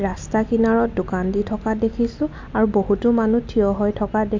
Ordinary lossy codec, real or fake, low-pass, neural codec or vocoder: MP3, 48 kbps; real; 7.2 kHz; none